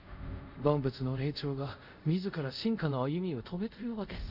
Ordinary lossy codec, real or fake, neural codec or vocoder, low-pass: none; fake; codec, 24 kHz, 0.5 kbps, DualCodec; 5.4 kHz